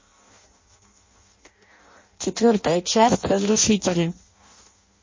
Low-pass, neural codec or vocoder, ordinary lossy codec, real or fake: 7.2 kHz; codec, 16 kHz in and 24 kHz out, 0.6 kbps, FireRedTTS-2 codec; MP3, 32 kbps; fake